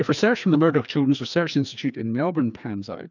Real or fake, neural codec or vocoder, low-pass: fake; codec, 16 kHz, 2 kbps, FreqCodec, larger model; 7.2 kHz